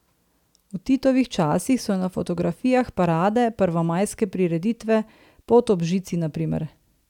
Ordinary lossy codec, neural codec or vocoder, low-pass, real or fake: none; none; 19.8 kHz; real